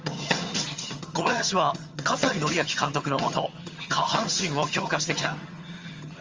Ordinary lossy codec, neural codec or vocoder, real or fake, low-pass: Opus, 32 kbps; vocoder, 22.05 kHz, 80 mel bands, HiFi-GAN; fake; 7.2 kHz